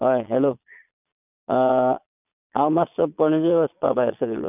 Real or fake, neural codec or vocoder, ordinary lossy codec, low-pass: fake; vocoder, 22.05 kHz, 80 mel bands, Vocos; none; 3.6 kHz